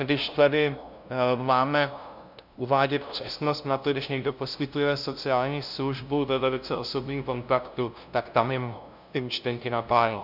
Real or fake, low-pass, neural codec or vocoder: fake; 5.4 kHz; codec, 16 kHz, 0.5 kbps, FunCodec, trained on LibriTTS, 25 frames a second